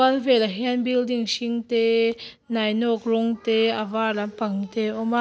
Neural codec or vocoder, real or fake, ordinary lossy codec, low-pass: none; real; none; none